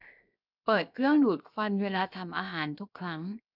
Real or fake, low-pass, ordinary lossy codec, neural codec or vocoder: fake; 5.4 kHz; none; codec, 16 kHz, 0.7 kbps, FocalCodec